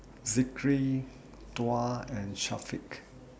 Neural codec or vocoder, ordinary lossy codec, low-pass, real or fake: none; none; none; real